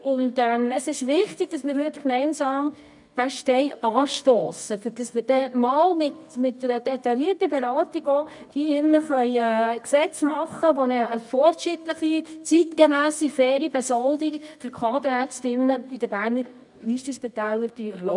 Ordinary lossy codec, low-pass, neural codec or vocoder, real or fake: none; 10.8 kHz; codec, 24 kHz, 0.9 kbps, WavTokenizer, medium music audio release; fake